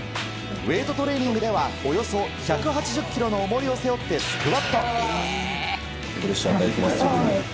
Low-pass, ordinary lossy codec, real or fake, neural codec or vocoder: none; none; real; none